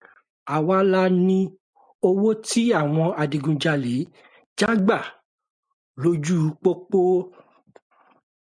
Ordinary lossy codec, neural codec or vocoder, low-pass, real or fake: MP3, 96 kbps; none; 9.9 kHz; real